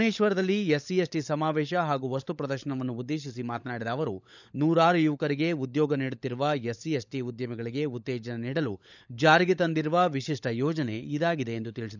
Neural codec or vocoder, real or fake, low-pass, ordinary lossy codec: codec, 16 kHz, 16 kbps, FunCodec, trained on LibriTTS, 50 frames a second; fake; 7.2 kHz; none